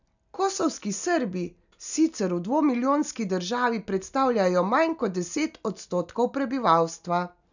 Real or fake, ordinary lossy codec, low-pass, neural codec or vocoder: real; none; 7.2 kHz; none